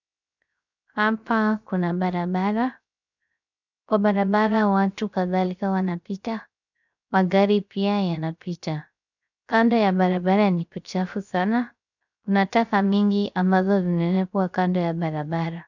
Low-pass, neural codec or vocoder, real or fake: 7.2 kHz; codec, 16 kHz, 0.3 kbps, FocalCodec; fake